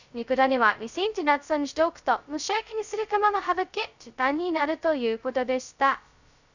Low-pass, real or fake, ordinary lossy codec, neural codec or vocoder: 7.2 kHz; fake; none; codec, 16 kHz, 0.2 kbps, FocalCodec